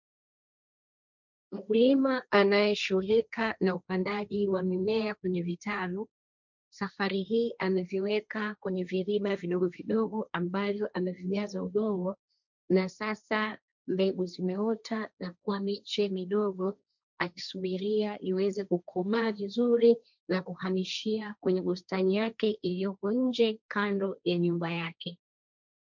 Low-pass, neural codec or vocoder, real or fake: 7.2 kHz; codec, 16 kHz, 1.1 kbps, Voila-Tokenizer; fake